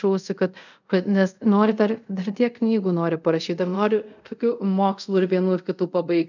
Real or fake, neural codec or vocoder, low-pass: fake; codec, 24 kHz, 0.5 kbps, DualCodec; 7.2 kHz